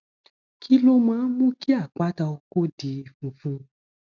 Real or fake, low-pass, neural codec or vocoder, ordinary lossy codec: real; 7.2 kHz; none; none